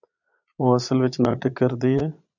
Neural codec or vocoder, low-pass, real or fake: vocoder, 22.05 kHz, 80 mel bands, Vocos; 7.2 kHz; fake